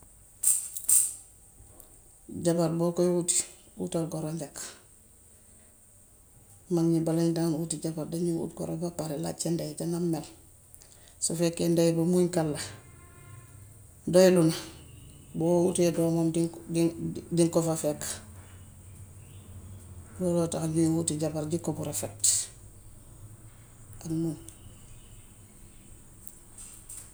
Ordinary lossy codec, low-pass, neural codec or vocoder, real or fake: none; none; none; real